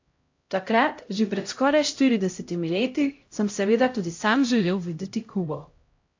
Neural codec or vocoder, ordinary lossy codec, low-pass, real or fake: codec, 16 kHz, 0.5 kbps, X-Codec, HuBERT features, trained on LibriSpeech; AAC, 48 kbps; 7.2 kHz; fake